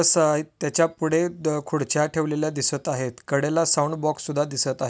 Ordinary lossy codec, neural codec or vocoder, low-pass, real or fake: none; none; none; real